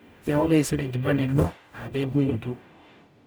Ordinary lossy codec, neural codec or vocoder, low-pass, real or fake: none; codec, 44.1 kHz, 0.9 kbps, DAC; none; fake